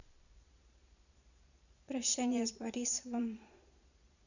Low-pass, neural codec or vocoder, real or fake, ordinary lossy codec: 7.2 kHz; vocoder, 22.05 kHz, 80 mel bands, Vocos; fake; none